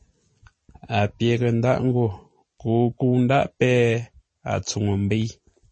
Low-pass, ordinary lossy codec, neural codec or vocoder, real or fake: 9.9 kHz; MP3, 32 kbps; none; real